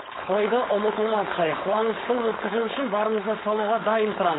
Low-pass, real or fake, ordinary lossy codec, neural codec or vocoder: 7.2 kHz; fake; AAC, 16 kbps; codec, 16 kHz, 4.8 kbps, FACodec